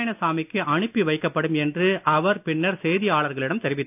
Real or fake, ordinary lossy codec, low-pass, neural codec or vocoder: real; none; 3.6 kHz; none